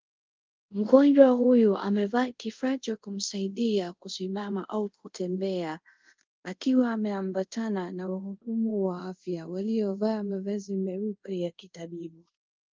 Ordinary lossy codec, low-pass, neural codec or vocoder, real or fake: Opus, 32 kbps; 7.2 kHz; codec, 24 kHz, 0.5 kbps, DualCodec; fake